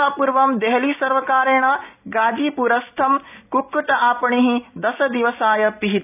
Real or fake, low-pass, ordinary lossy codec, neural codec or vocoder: real; 3.6 kHz; none; none